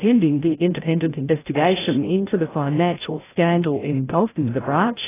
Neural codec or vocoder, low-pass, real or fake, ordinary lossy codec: codec, 16 kHz, 0.5 kbps, FreqCodec, larger model; 3.6 kHz; fake; AAC, 16 kbps